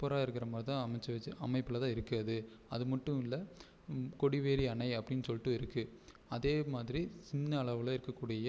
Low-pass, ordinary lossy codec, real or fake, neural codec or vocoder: none; none; real; none